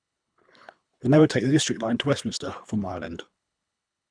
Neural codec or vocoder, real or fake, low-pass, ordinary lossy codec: codec, 24 kHz, 3 kbps, HILCodec; fake; 9.9 kHz; none